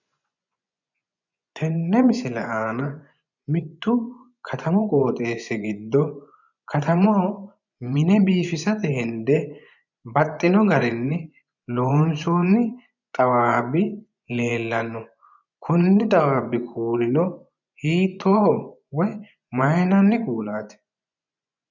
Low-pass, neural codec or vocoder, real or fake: 7.2 kHz; vocoder, 24 kHz, 100 mel bands, Vocos; fake